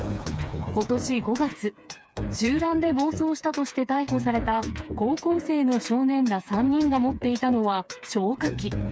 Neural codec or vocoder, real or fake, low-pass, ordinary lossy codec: codec, 16 kHz, 4 kbps, FreqCodec, smaller model; fake; none; none